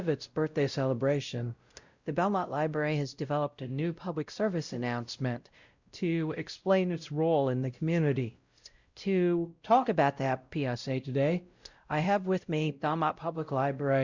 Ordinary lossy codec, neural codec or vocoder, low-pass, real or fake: Opus, 64 kbps; codec, 16 kHz, 0.5 kbps, X-Codec, WavLM features, trained on Multilingual LibriSpeech; 7.2 kHz; fake